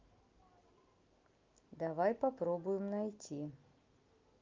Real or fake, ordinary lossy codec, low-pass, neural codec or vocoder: real; Opus, 32 kbps; 7.2 kHz; none